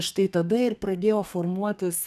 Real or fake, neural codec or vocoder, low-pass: fake; codec, 32 kHz, 1.9 kbps, SNAC; 14.4 kHz